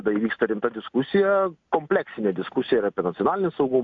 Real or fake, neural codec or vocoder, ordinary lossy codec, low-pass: real; none; AAC, 64 kbps; 7.2 kHz